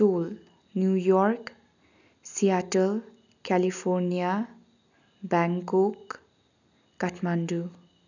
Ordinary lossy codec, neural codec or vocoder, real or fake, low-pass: none; none; real; 7.2 kHz